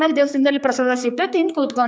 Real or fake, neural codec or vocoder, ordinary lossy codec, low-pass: fake; codec, 16 kHz, 4 kbps, X-Codec, HuBERT features, trained on general audio; none; none